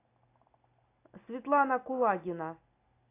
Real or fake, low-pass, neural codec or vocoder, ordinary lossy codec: real; 3.6 kHz; none; AAC, 24 kbps